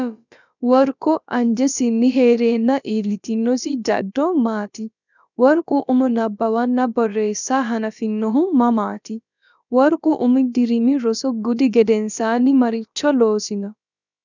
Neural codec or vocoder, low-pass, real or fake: codec, 16 kHz, about 1 kbps, DyCAST, with the encoder's durations; 7.2 kHz; fake